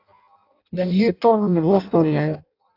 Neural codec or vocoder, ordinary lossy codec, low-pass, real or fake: codec, 16 kHz in and 24 kHz out, 0.6 kbps, FireRedTTS-2 codec; AAC, 32 kbps; 5.4 kHz; fake